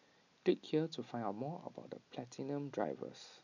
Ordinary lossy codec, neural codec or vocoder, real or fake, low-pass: none; none; real; 7.2 kHz